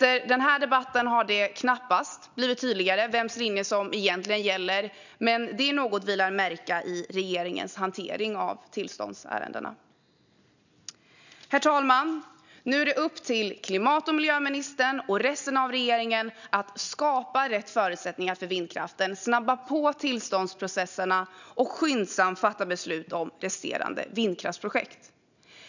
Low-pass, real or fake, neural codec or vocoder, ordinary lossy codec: 7.2 kHz; real; none; none